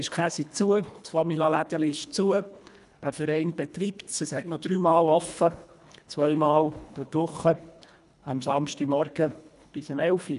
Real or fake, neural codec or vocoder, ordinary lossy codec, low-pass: fake; codec, 24 kHz, 1.5 kbps, HILCodec; none; 10.8 kHz